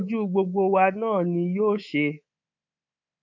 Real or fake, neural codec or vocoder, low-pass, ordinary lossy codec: fake; codec, 24 kHz, 3.1 kbps, DualCodec; 7.2 kHz; MP3, 48 kbps